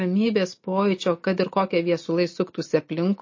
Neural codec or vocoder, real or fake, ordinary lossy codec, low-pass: none; real; MP3, 32 kbps; 7.2 kHz